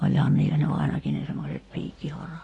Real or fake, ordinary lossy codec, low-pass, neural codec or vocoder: real; AAC, 32 kbps; 10.8 kHz; none